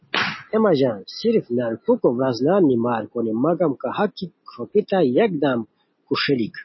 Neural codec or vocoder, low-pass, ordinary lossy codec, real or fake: none; 7.2 kHz; MP3, 24 kbps; real